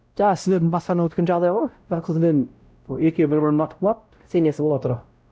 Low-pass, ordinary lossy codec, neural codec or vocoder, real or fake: none; none; codec, 16 kHz, 0.5 kbps, X-Codec, WavLM features, trained on Multilingual LibriSpeech; fake